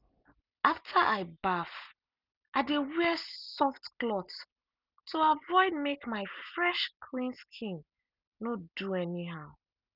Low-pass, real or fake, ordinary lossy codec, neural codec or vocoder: 5.4 kHz; real; none; none